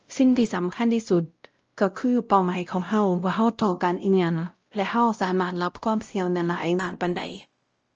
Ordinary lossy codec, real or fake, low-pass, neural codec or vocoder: Opus, 24 kbps; fake; 7.2 kHz; codec, 16 kHz, 0.5 kbps, X-Codec, WavLM features, trained on Multilingual LibriSpeech